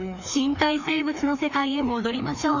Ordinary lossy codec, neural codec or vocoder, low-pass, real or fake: none; codec, 16 kHz, 2 kbps, FreqCodec, larger model; 7.2 kHz; fake